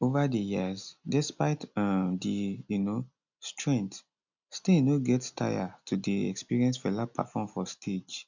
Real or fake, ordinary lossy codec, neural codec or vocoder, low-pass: real; none; none; 7.2 kHz